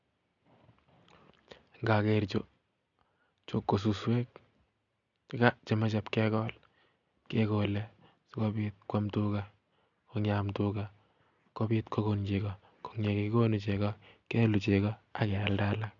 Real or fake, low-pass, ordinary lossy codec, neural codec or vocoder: real; 7.2 kHz; none; none